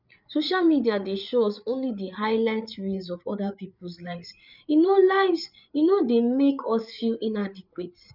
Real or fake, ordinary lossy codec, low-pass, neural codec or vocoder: fake; none; 5.4 kHz; codec, 16 kHz, 8 kbps, FreqCodec, larger model